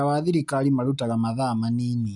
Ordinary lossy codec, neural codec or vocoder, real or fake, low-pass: none; none; real; 10.8 kHz